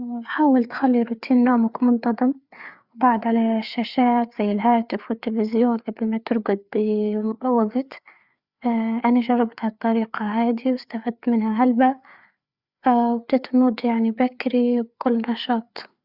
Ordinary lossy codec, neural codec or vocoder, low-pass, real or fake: Opus, 64 kbps; codec, 16 kHz, 4 kbps, FreqCodec, larger model; 5.4 kHz; fake